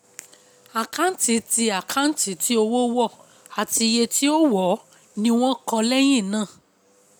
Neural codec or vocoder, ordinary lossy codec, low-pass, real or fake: none; none; none; real